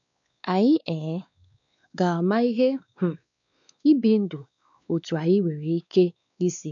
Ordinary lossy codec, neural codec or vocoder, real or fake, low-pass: none; codec, 16 kHz, 2 kbps, X-Codec, WavLM features, trained on Multilingual LibriSpeech; fake; 7.2 kHz